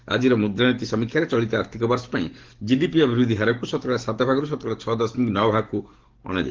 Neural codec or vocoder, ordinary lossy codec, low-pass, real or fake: codec, 44.1 kHz, 7.8 kbps, DAC; Opus, 16 kbps; 7.2 kHz; fake